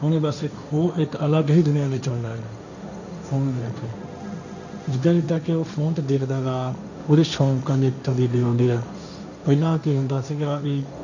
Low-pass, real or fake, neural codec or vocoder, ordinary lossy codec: 7.2 kHz; fake; codec, 16 kHz, 1.1 kbps, Voila-Tokenizer; none